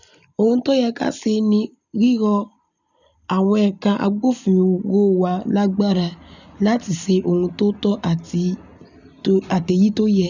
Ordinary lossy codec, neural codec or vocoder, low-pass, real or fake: none; none; 7.2 kHz; real